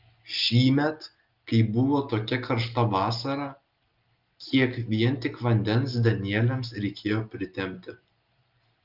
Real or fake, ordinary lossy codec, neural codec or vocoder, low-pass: real; Opus, 32 kbps; none; 5.4 kHz